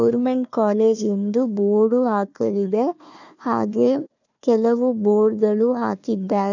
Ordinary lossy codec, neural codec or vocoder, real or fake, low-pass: none; codec, 16 kHz, 1 kbps, FunCodec, trained on Chinese and English, 50 frames a second; fake; 7.2 kHz